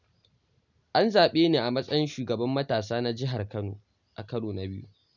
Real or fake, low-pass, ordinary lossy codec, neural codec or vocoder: real; 7.2 kHz; none; none